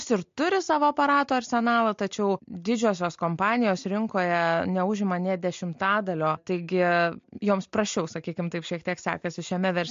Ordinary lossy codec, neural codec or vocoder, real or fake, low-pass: MP3, 48 kbps; none; real; 7.2 kHz